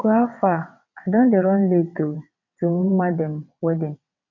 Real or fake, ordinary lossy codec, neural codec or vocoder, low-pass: fake; none; vocoder, 22.05 kHz, 80 mel bands, WaveNeXt; 7.2 kHz